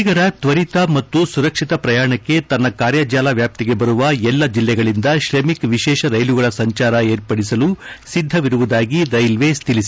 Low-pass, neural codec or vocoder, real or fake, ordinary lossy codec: none; none; real; none